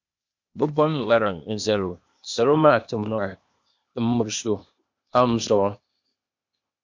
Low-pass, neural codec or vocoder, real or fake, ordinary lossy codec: 7.2 kHz; codec, 16 kHz, 0.8 kbps, ZipCodec; fake; MP3, 64 kbps